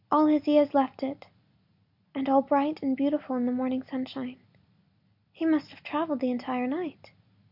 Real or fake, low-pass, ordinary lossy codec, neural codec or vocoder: real; 5.4 kHz; MP3, 48 kbps; none